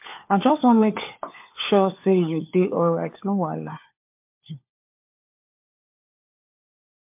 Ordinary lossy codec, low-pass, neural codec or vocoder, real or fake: MP3, 32 kbps; 3.6 kHz; codec, 16 kHz, 4 kbps, FunCodec, trained on LibriTTS, 50 frames a second; fake